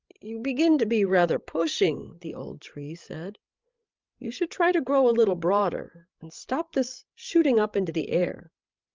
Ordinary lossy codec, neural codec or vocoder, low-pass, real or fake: Opus, 24 kbps; codec, 16 kHz, 16 kbps, FreqCodec, larger model; 7.2 kHz; fake